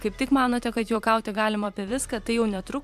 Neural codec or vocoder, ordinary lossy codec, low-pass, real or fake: vocoder, 44.1 kHz, 128 mel bands every 512 samples, BigVGAN v2; MP3, 96 kbps; 14.4 kHz; fake